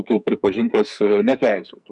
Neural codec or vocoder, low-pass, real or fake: codec, 44.1 kHz, 2.6 kbps, SNAC; 10.8 kHz; fake